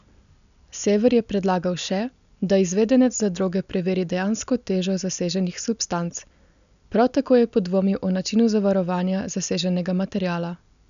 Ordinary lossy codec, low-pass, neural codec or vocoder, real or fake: none; 7.2 kHz; none; real